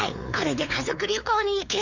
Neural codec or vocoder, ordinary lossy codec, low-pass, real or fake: codec, 16 kHz, 4 kbps, X-Codec, WavLM features, trained on Multilingual LibriSpeech; none; 7.2 kHz; fake